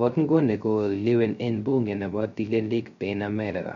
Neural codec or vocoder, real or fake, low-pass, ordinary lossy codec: codec, 16 kHz, 0.3 kbps, FocalCodec; fake; 7.2 kHz; MP3, 48 kbps